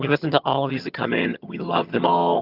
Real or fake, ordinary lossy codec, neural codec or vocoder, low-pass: fake; Opus, 32 kbps; vocoder, 22.05 kHz, 80 mel bands, HiFi-GAN; 5.4 kHz